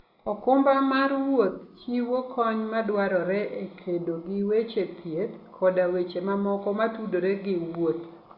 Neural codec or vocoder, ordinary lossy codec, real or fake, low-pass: none; Opus, 64 kbps; real; 5.4 kHz